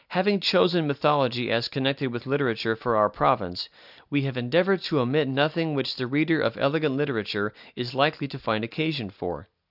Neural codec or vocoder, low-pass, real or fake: none; 5.4 kHz; real